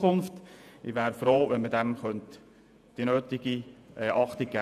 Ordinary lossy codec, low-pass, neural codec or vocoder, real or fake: none; 14.4 kHz; vocoder, 48 kHz, 128 mel bands, Vocos; fake